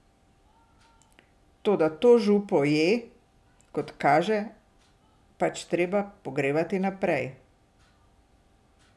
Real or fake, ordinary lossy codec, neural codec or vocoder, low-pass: real; none; none; none